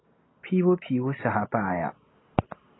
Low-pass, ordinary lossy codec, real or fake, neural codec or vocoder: 7.2 kHz; AAC, 16 kbps; real; none